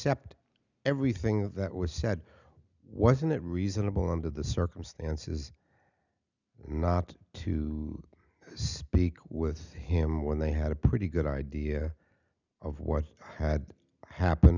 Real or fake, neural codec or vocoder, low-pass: real; none; 7.2 kHz